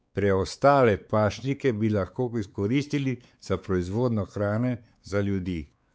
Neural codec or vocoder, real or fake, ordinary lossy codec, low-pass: codec, 16 kHz, 4 kbps, X-Codec, WavLM features, trained on Multilingual LibriSpeech; fake; none; none